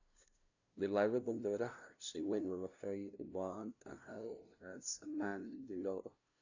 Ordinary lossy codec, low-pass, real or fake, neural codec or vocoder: none; 7.2 kHz; fake; codec, 16 kHz, 0.5 kbps, FunCodec, trained on LibriTTS, 25 frames a second